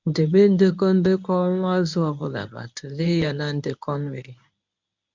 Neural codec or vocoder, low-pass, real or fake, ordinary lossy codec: codec, 24 kHz, 0.9 kbps, WavTokenizer, medium speech release version 1; 7.2 kHz; fake; MP3, 64 kbps